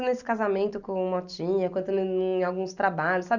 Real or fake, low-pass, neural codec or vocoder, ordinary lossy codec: real; 7.2 kHz; none; none